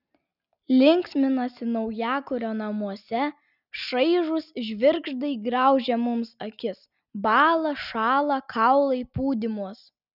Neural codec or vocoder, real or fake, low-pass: none; real; 5.4 kHz